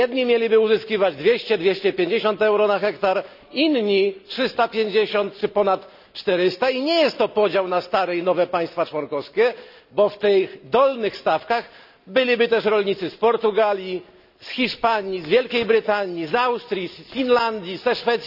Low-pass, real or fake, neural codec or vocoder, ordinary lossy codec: 5.4 kHz; real; none; none